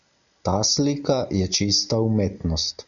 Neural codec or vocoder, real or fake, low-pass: none; real; 7.2 kHz